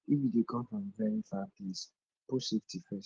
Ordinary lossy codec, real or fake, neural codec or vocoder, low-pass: Opus, 16 kbps; real; none; 7.2 kHz